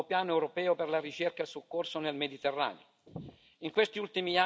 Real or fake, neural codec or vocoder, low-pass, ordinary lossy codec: real; none; none; none